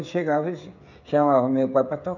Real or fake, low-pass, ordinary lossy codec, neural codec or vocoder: fake; 7.2 kHz; none; codec, 16 kHz, 16 kbps, FreqCodec, smaller model